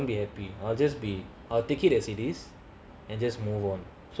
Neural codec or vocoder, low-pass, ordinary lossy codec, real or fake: none; none; none; real